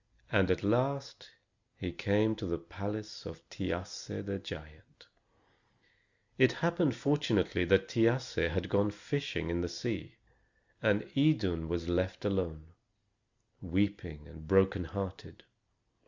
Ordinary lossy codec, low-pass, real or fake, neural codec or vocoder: Opus, 64 kbps; 7.2 kHz; real; none